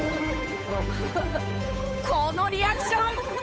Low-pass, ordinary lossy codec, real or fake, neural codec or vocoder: none; none; fake; codec, 16 kHz, 8 kbps, FunCodec, trained on Chinese and English, 25 frames a second